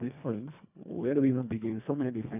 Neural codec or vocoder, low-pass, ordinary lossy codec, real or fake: codec, 24 kHz, 1.5 kbps, HILCodec; 3.6 kHz; none; fake